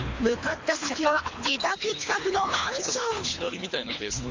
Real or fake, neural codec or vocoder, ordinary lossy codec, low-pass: fake; codec, 24 kHz, 3 kbps, HILCodec; MP3, 48 kbps; 7.2 kHz